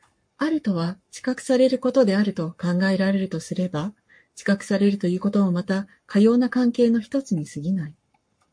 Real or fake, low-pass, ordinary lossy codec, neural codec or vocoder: fake; 9.9 kHz; MP3, 48 kbps; codec, 44.1 kHz, 7.8 kbps, Pupu-Codec